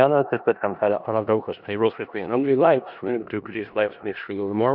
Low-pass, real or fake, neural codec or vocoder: 5.4 kHz; fake; codec, 16 kHz in and 24 kHz out, 0.4 kbps, LongCat-Audio-Codec, four codebook decoder